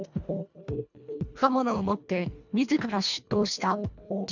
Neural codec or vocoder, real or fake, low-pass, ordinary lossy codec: codec, 24 kHz, 1.5 kbps, HILCodec; fake; 7.2 kHz; none